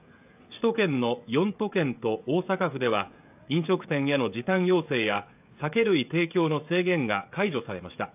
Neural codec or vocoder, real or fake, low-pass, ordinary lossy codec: codec, 16 kHz, 16 kbps, FreqCodec, smaller model; fake; 3.6 kHz; AAC, 32 kbps